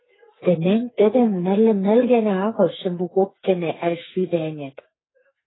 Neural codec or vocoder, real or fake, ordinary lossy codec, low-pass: codec, 44.1 kHz, 2.6 kbps, SNAC; fake; AAC, 16 kbps; 7.2 kHz